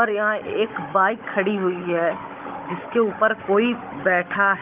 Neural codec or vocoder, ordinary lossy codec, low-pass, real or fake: codec, 16 kHz, 16 kbps, FunCodec, trained on Chinese and English, 50 frames a second; Opus, 24 kbps; 3.6 kHz; fake